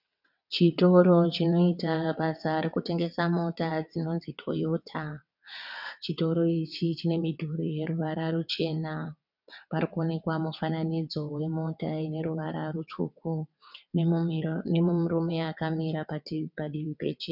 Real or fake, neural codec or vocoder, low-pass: fake; vocoder, 22.05 kHz, 80 mel bands, WaveNeXt; 5.4 kHz